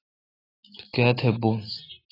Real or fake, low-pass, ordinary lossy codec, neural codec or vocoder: real; 5.4 kHz; AAC, 24 kbps; none